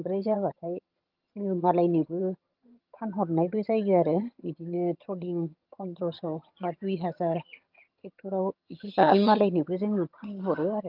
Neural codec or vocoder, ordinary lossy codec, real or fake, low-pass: vocoder, 22.05 kHz, 80 mel bands, HiFi-GAN; Opus, 32 kbps; fake; 5.4 kHz